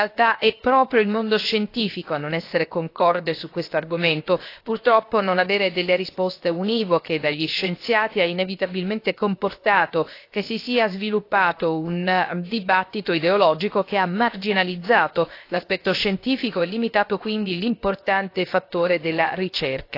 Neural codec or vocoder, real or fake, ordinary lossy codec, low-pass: codec, 16 kHz, 0.7 kbps, FocalCodec; fake; AAC, 32 kbps; 5.4 kHz